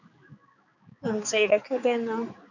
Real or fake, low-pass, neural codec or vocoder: fake; 7.2 kHz; codec, 16 kHz, 4 kbps, X-Codec, HuBERT features, trained on balanced general audio